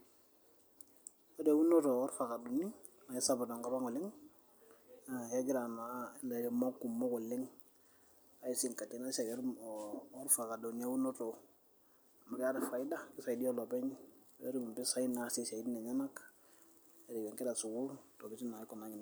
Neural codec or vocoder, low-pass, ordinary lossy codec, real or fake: none; none; none; real